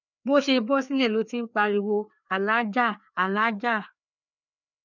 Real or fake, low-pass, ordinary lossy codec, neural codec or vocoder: fake; 7.2 kHz; none; codec, 16 kHz, 2 kbps, FreqCodec, larger model